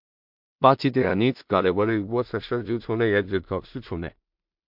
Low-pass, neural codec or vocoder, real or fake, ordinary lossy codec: 5.4 kHz; codec, 16 kHz in and 24 kHz out, 0.4 kbps, LongCat-Audio-Codec, two codebook decoder; fake; MP3, 48 kbps